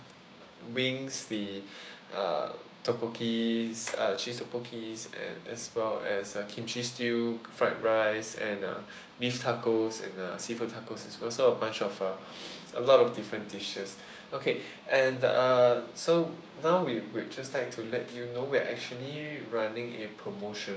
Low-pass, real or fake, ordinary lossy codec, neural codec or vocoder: none; fake; none; codec, 16 kHz, 6 kbps, DAC